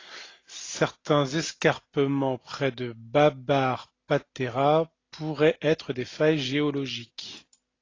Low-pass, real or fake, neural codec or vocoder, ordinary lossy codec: 7.2 kHz; real; none; AAC, 32 kbps